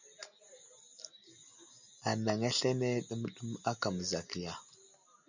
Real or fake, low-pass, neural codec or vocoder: real; 7.2 kHz; none